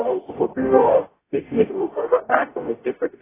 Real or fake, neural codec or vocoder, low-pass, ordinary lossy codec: fake; codec, 44.1 kHz, 0.9 kbps, DAC; 3.6 kHz; AAC, 16 kbps